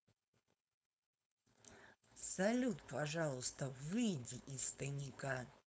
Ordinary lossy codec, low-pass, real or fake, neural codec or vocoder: none; none; fake; codec, 16 kHz, 4.8 kbps, FACodec